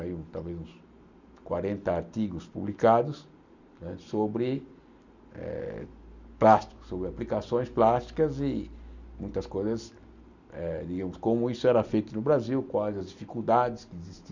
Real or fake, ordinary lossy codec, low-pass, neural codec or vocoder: real; none; 7.2 kHz; none